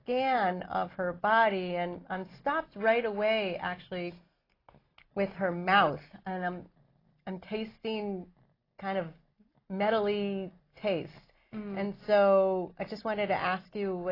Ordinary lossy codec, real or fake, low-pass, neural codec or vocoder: AAC, 24 kbps; real; 5.4 kHz; none